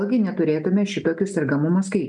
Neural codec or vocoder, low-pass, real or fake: none; 9.9 kHz; real